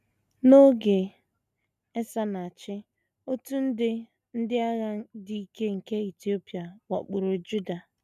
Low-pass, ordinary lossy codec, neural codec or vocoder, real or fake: 14.4 kHz; none; none; real